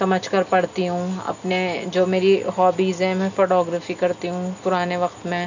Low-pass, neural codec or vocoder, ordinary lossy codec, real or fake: 7.2 kHz; none; none; real